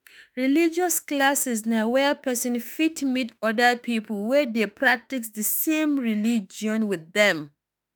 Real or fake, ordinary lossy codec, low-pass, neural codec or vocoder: fake; none; none; autoencoder, 48 kHz, 32 numbers a frame, DAC-VAE, trained on Japanese speech